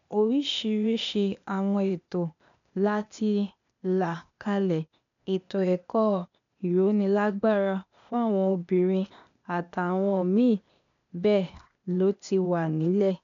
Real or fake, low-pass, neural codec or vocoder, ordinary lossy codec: fake; 7.2 kHz; codec, 16 kHz, 0.8 kbps, ZipCodec; none